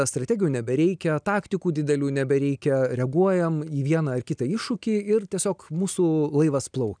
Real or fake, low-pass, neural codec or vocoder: real; 9.9 kHz; none